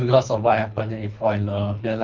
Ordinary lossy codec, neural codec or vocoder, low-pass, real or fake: none; codec, 24 kHz, 3 kbps, HILCodec; 7.2 kHz; fake